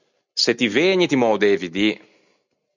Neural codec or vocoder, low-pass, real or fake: none; 7.2 kHz; real